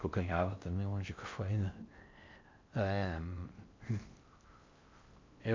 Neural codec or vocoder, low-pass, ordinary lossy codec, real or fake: codec, 16 kHz in and 24 kHz out, 0.6 kbps, FocalCodec, streaming, 4096 codes; 7.2 kHz; MP3, 48 kbps; fake